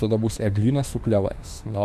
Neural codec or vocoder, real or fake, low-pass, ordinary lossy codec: autoencoder, 48 kHz, 32 numbers a frame, DAC-VAE, trained on Japanese speech; fake; 14.4 kHz; Opus, 64 kbps